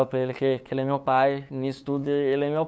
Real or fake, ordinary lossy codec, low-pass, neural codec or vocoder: fake; none; none; codec, 16 kHz, 8 kbps, FunCodec, trained on LibriTTS, 25 frames a second